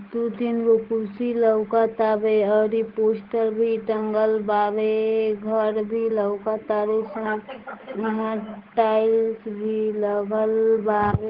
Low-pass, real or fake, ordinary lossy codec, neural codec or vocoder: 5.4 kHz; fake; Opus, 16 kbps; codec, 16 kHz, 8 kbps, FunCodec, trained on Chinese and English, 25 frames a second